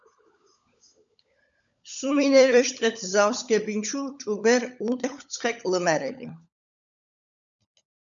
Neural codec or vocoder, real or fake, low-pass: codec, 16 kHz, 16 kbps, FunCodec, trained on LibriTTS, 50 frames a second; fake; 7.2 kHz